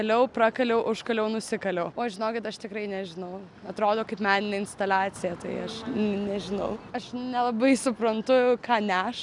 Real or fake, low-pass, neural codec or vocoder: real; 10.8 kHz; none